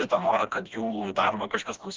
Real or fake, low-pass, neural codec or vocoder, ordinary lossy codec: fake; 7.2 kHz; codec, 16 kHz, 1 kbps, FreqCodec, smaller model; Opus, 24 kbps